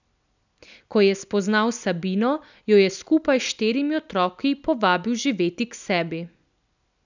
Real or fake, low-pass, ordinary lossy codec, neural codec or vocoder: real; 7.2 kHz; none; none